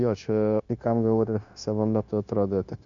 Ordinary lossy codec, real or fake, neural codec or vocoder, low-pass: MP3, 64 kbps; fake; codec, 16 kHz, 0.9 kbps, LongCat-Audio-Codec; 7.2 kHz